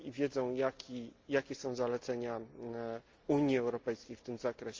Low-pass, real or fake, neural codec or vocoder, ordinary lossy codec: 7.2 kHz; real; none; Opus, 24 kbps